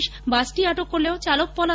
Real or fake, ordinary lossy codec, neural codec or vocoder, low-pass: real; none; none; none